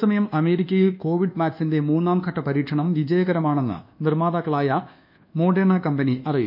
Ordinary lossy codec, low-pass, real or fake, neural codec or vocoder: none; 5.4 kHz; fake; codec, 24 kHz, 1.2 kbps, DualCodec